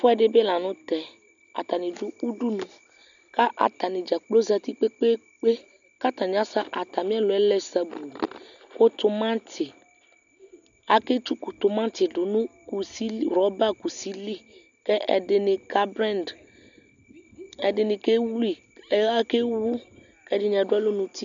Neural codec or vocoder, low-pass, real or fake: none; 7.2 kHz; real